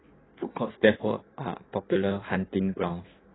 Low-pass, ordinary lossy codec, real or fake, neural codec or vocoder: 7.2 kHz; AAC, 16 kbps; fake; codec, 16 kHz in and 24 kHz out, 1.1 kbps, FireRedTTS-2 codec